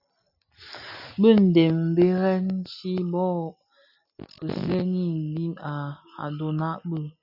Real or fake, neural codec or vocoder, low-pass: real; none; 5.4 kHz